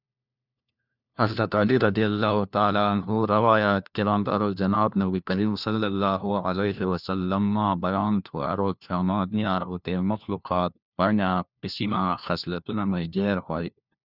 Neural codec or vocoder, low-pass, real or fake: codec, 16 kHz, 1 kbps, FunCodec, trained on LibriTTS, 50 frames a second; 5.4 kHz; fake